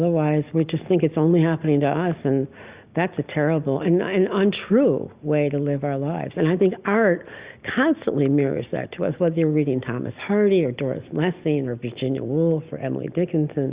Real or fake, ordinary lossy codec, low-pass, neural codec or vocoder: real; Opus, 64 kbps; 3.6 kHz; none